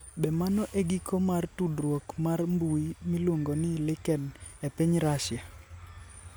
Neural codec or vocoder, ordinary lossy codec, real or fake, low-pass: none; none; real; none